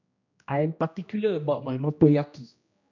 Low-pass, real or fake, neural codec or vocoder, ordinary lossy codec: 7.2 kHz; fake; codec, 16 kHz, 1 kbps, X-Codec, HuBERT features, trained on general audio; none